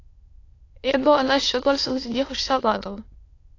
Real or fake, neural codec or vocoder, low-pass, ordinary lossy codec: fake; autoencoder, 22.05 kHz, a latent of 192 numbers a frame, VITS, trained on many speakers; 7.2 kHz; AAC, 32 kbps